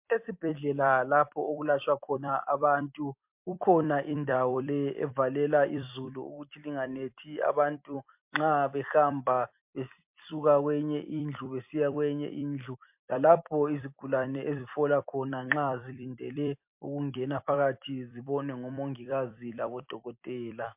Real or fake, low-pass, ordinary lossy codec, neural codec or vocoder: fake; 3.6 kHz; MP3, 32 kbps; vocoder, 44.1 kHz, 128 mel bands every 256 samples, BigVGAN v2